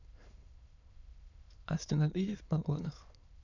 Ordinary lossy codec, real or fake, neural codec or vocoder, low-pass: none; fake; autoencoder, 22.05 kHz, a latent of 192 numbers a frame, VITS, trained on many speakers; 7.2 kHz